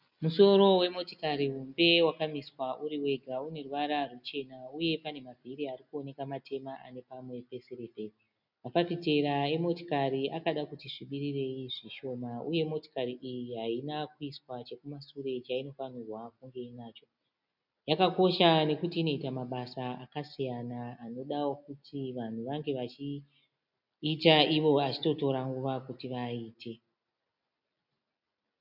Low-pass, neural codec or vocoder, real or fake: 5.4 kHz; none; real